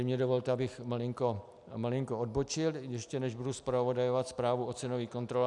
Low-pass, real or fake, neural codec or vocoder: 10.8 kHz; real; none